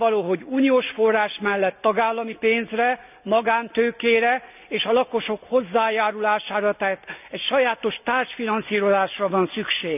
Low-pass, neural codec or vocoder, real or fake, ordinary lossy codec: 3.6 kHz; none; real; none